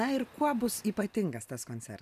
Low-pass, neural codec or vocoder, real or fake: 14.4 kHz; none; real